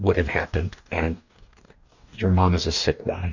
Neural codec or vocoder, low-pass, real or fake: codec, 44.1 kHz, 2.6 kbps, DAC; 7.2 kHz; fake